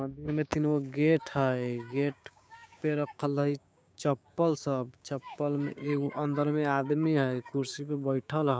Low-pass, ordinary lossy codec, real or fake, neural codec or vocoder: none; none; fake; codec, 16 kHz, 6 kbps, DAC